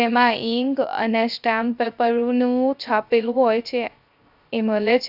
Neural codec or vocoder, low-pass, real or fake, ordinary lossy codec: codec, 16 kHz, 0.3 kbps, FocalCodec; 5.4 kHz; fake; none